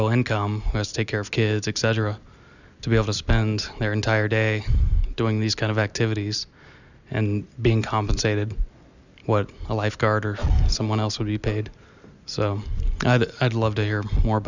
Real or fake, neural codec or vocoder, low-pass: real; none; 7.2 kHz